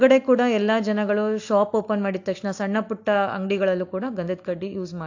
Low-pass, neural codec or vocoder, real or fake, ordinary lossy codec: 7.2 kHz; none; real; AAC, 48 kbps